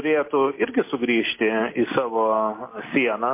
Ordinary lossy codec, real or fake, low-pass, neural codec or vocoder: MP3, 24 kbps; real; 3.6 kHz; none